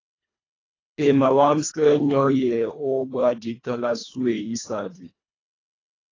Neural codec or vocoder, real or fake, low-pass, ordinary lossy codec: codec, 24 kHz, 1.5 kbps, HILCodec; fake; 7.2 kHz; AAC, 32 kbps